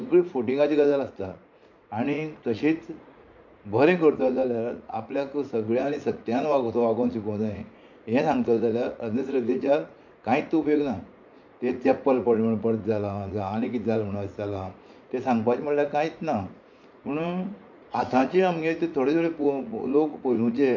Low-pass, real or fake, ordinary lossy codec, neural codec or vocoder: 7.2 kHz; fake; MP3, 48 kbps; vocoder, 44.1 kHz, 80 mel bands, Vocos